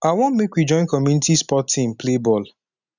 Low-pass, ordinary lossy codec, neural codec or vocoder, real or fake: 7.2 kHz; none; none; real